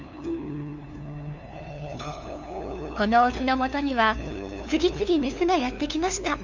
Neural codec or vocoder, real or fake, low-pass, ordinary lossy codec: codec, 16 kHz, 2 kbps, FunCodec, trained on LibriTTS, 25 frames a second; fake; 7.2 kHz; none